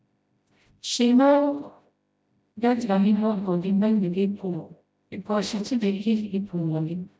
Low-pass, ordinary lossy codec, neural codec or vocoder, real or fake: none; none; codec, 16 kHz, 0.5 kbps, FreqCodec, smaller model; fake